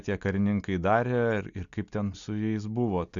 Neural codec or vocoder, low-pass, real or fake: none; 7.2 kHz; real